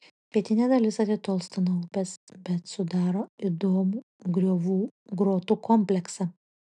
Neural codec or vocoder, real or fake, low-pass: none; real; 10.8 kHz